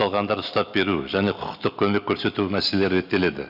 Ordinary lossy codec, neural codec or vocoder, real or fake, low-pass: none; none; real; 5.4 kHz